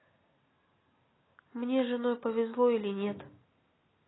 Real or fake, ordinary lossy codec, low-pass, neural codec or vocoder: real; AAC, 16 kbps; 7.2 kHz; none